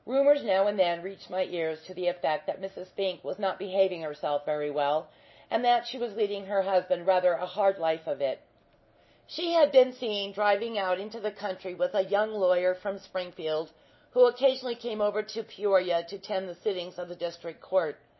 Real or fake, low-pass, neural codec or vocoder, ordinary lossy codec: real; 7.2 kHz; none; MP3, 24 kbps